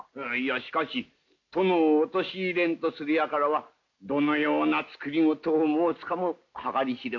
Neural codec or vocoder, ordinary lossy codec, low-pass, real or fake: none; MP3, 64 kbps; 7.2 kHz; real